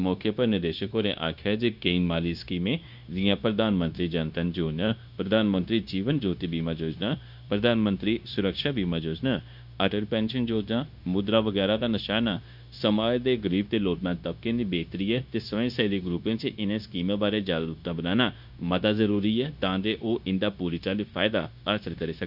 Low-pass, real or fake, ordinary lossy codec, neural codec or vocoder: 5.4 kHz; fake; none; codec, 16 kHz, 0.9 kbps, LongCat-Audio-Codec